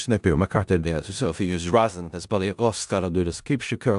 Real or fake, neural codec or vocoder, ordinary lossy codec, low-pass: fake; codec, 16 kHz in and 24 kHz out, 0.4 kbps, LongCat-Audio-Codec, four codebook decoder; AAC, 96 kbps; 10.8 kHz